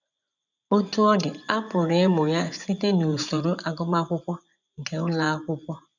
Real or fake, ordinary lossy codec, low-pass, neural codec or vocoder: fake; none; 7.2 kHz; vocoder, 24 kHz, 100 mel bands, Vocos